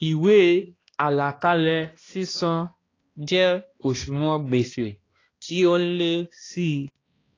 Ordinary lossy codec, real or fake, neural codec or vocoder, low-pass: AAC, 32 kbps; fake; codec, 16 kHz, 1 kbps, X-Codec, HuBERT features, trained on balanced general audio; 7.2 kHz